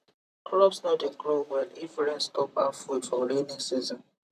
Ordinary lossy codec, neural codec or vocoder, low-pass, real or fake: AAC, 96 kbps; vocoder, 44.1 kHz, 128 mel bands, Pupu-Vocoder; 14.4 kHz; fake